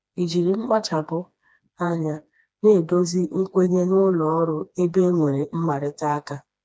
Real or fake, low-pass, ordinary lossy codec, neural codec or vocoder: fake; none; none; codec, 16 kHz, 2 kbps, FreqCodec, smaller model